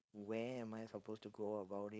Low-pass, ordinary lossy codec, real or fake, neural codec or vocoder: none; none; fake; codec, 16 kHz, 4.8 kbps, FACodec